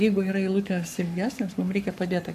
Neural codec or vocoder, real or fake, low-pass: codec, 44.1 kHz, 7.8 kbps, Pupu-Codec; fake; 14.4 kHz